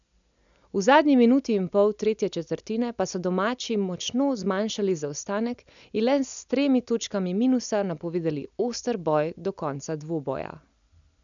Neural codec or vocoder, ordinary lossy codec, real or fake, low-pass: none; none; real; 7.2 kHz